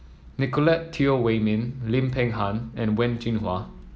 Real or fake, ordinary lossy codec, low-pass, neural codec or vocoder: real; none; none; none